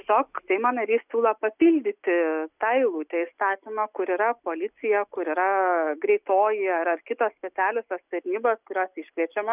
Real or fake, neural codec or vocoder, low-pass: real; none; 3.6 kHz